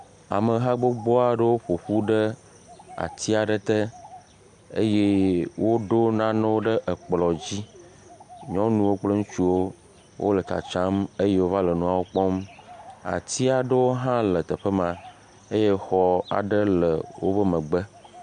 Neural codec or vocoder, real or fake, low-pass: none; real; 9.9 kHz